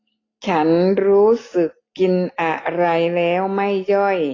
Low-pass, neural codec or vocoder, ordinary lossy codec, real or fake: 7.2 kHz; none; AAC, 32 kbps; real